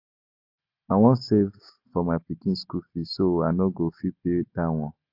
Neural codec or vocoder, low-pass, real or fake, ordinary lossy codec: none; 5.4 kHz; real; AAC, 48 kbps